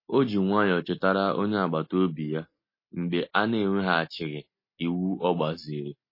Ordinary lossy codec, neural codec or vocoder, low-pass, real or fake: MP3, 24 kbps; none; 5.4 kHz; real